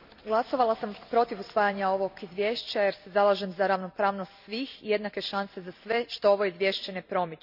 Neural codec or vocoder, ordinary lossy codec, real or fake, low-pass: none; none; real; 5.4 kHz